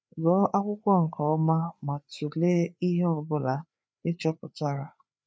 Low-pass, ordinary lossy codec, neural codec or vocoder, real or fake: none; none; codec, 16 kHz, 4 kbps, FreqCodec, larger model; fake